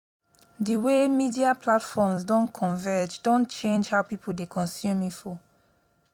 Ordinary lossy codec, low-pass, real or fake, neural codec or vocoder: none; 19.8 kHz; fake; vocoder, 44.1 kHz, 128 mel bands every 256 samples, BigVGAN v2